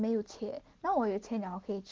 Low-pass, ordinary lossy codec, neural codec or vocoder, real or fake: 7.2 kHz; Opus, 16 kbps; none; real